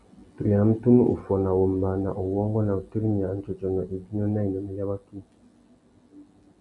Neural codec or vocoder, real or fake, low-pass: none; real; 10.8 kHz